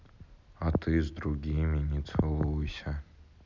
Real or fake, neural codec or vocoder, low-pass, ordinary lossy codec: real; none; 7.2 kHz; none